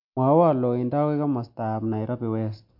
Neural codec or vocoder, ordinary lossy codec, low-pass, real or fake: none; MP3, 48 kbps; 5.4 kHz; real